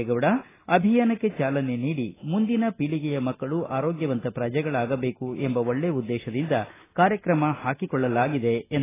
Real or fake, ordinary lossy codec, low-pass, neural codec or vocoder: real; AAC, 16 kbps; 3.6 kHz; none